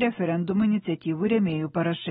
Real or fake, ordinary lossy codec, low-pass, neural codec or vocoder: real; AAC, 16 kbps; 19.8 kHz; none